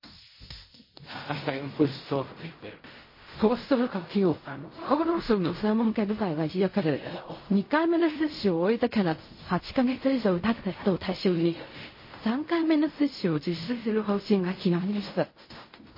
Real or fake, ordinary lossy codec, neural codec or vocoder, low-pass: fake; MP3, 24 kbps; codec, 16 kHz in and 24 kHz out, 0.4 kbps, LongCat-Audio-Codec, fine tuned four codebook decoder; 5.4 kHz